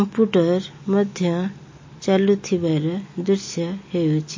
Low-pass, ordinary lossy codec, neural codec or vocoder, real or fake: 7.2 kHz; MP3, 32 kbps; none; real